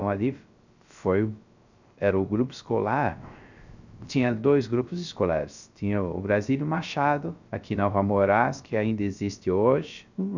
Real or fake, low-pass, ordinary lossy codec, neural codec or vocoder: fake; 7.2 kHz; none; codec, 16 kHz, 0.3 kbps, FocalCodec